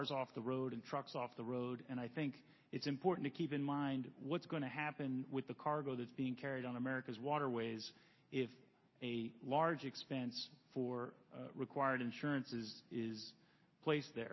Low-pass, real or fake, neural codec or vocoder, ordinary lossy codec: 7.2 kHz; real; none; MP3, 24 kbps